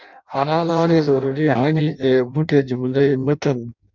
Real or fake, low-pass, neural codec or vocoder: fake; 7.2 kHz; codec, 16 kHz in and 24 kHz out, 0.6 kbps, FireRedTTS-2 codec